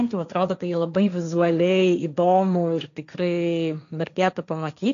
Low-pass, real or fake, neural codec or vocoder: 7.2 kHz; fake; codec, 16 kHz, 1.1 kbps, Voila-Tokenizer